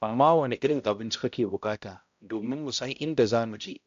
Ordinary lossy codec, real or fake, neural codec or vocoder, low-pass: AAC, 64 kbps; fake; codec, 16 kHz, 0.5 kbps, X-Codec, HuBERT features, trained on balanced general audio; 7.2 kHz